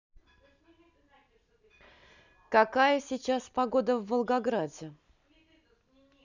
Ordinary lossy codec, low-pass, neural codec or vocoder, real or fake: none; 7.2 kHz; none; real